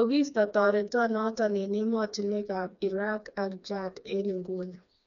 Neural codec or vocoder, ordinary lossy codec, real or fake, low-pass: codec, 16 kHz, 2 kbps, FreqCodec, smaller model; none; fake; 7.2 kHz